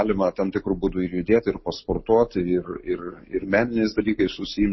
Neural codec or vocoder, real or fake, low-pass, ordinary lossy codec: none; real; 7.2 kHz; MP3, 24 kbps